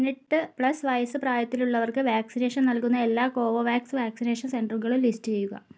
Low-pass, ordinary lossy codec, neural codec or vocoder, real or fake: none; none; none; real